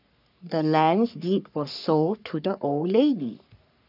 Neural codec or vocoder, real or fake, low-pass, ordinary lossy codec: codec, 44.1 kHz, 3.4 kbps, Pupu-Codec; fake; 5.4 kHz; none